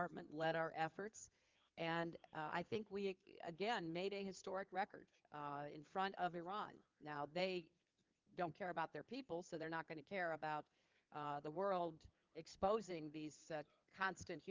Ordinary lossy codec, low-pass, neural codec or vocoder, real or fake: Opus, 24 kbps; 7.2 kHz; codec, 16 kHz in and 24 kHz out, 2.2 kbps, FireRedTTS-2 codec; fake